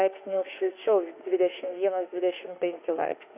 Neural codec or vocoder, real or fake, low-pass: autoencoder, 48 kHz, 32 numbers a frame, DAC-VAE, trained on Japanese speech; fake; 3.6 kHz